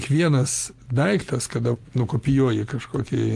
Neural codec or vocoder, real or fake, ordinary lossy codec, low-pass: none; real; Opus, 32 kbps; 14.4 kHz